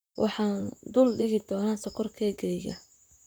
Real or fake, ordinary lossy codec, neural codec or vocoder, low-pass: fake; none; vocoder, 44.1 kHz, 128 mel bands, Pupu-Vocoder; none